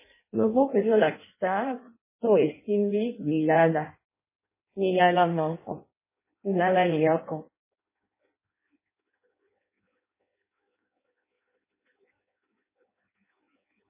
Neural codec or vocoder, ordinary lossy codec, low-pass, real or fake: codec, 16 kHz in and 24 kHz out, 0.6 kbps, FireRedTTS-2 codec; MP3, 16 kbps; 3.6 kHz; fake